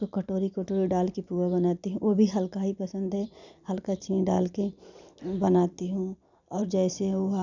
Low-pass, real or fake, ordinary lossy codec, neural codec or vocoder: 7.2 kHz; fake; none; vocoder, 44.1 kHz, 128 mel bands every 256 samples, BigVGAN v2